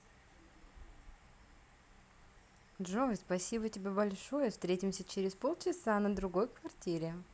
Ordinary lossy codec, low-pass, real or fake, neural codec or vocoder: none; none; real; none